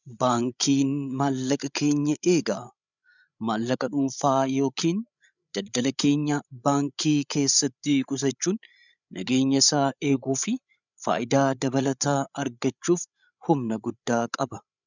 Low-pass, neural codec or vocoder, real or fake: 7.2 kHz; codec, 16 kHz, 8 kbps, FreqCodec, larger model; fake